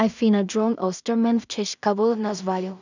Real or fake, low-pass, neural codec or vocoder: fake; 7.2 kHz; codec, 16 kHz in and 24 kHz out, 0.4 kbps, LongCat-Audio-Codec, two codebook decoder